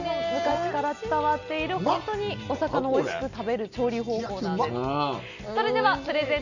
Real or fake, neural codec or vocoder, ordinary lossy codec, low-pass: real; none; none; 7.2 kHz